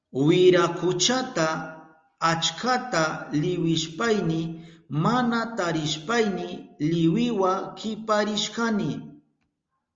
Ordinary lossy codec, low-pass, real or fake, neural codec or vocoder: Opus, 32 kbps; 7.2 kHz; real; none